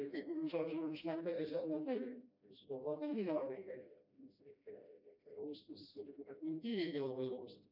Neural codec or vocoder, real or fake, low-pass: codec, 16 kHz, 1 kbps, FreqCodec, smaller model; fake; 5.4 kHz